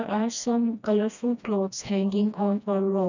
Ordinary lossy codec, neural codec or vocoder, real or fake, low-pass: none; codec, 16 kHz, 1 kbps, FreqCodec, smaller model; fake; 7.2 kHz